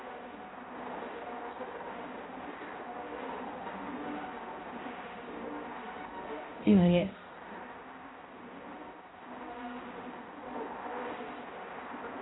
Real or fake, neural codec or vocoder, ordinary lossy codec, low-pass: fake; codec, 16 kHz, 0.5 kbps, X-Codec, HuBERT features, trained on balanced general audio; AAC, 16 kbps; 7.2 kHz